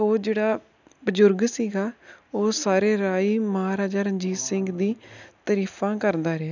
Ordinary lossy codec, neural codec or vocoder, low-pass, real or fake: none; none; 7.2 kHz; real